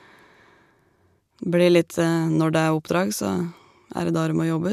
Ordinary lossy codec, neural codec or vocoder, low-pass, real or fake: none; none; 14.4 kHz; real